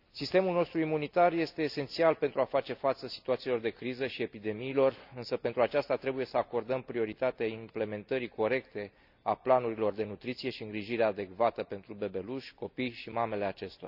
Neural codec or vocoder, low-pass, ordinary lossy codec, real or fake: none; 5.4 kHz; none; real